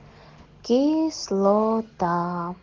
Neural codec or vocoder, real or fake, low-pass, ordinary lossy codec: none; real; 7.2 kHz; Opus, 16 kbps